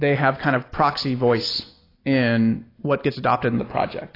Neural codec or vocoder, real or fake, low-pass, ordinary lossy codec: none; real; 5.4 kHz; AAC, 24 kbps